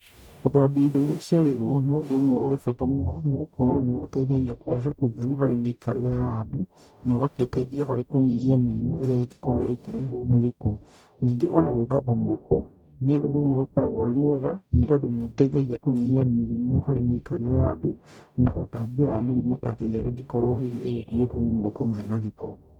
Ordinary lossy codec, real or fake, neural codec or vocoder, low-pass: none; fake; codec, 44.1 kHz, 0.9 kbps, DAC; 19.8 kHz